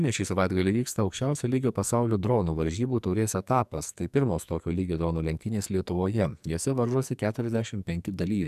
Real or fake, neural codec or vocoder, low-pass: fake; codec, 44.1 kHz, 2.6 kbps, SNAC; 14.4 kHz